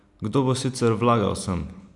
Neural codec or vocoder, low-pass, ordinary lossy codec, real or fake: none; 10.8 kHz; none; real